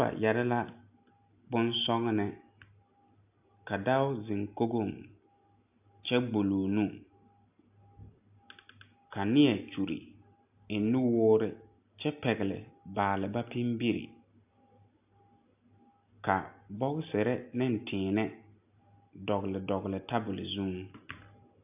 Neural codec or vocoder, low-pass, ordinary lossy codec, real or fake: none; 3.6 kHz; AAC, 32 kbps; real